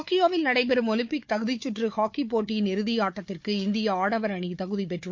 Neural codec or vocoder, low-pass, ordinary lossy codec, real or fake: codec, 16 kHz, 4 kbps, FunCodec, trained on Chinese and English, 50 frames a second; 7.2 kHz; MP3, 48 kbps; fake